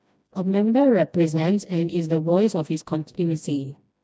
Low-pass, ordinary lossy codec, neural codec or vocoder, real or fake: none; none; codec, 16 kHz, 1 kbps, FreqCodec, smaller model; fake